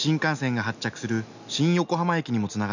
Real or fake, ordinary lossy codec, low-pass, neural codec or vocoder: real; none; 7.2 kHz; none